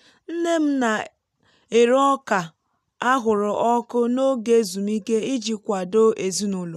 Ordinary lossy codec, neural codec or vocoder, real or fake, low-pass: none; none; real; 14.4 kHz